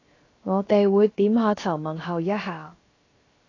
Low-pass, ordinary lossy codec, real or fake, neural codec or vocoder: 7.2 kHz; AAC, 32 kbps; fake; codec, 16 kHz, 0.7 kbps, FocalCodec